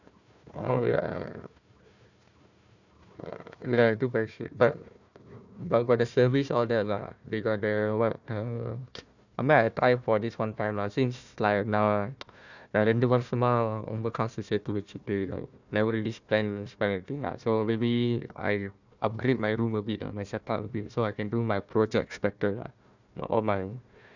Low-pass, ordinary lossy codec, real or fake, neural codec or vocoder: 7.2 kHz; none; fake; codec, 16 kHz, 1 kbps, FunCodec, trained on Chinese and English, 50 frames a second